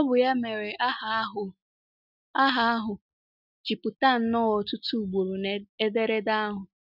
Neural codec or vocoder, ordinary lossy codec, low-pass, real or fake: none; none; 5.4 kHz; real